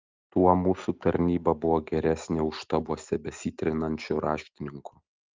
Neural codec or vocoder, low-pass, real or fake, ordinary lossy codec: none; 7.2 kHz; real; Opus, 32 kbps